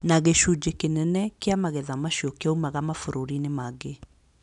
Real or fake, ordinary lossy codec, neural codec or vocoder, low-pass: real; none; none; 10.8 kHz